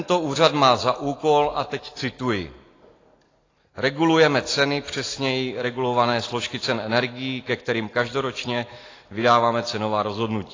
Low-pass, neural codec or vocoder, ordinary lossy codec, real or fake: 7.2 kHz; none; AAC, 32 kbps; real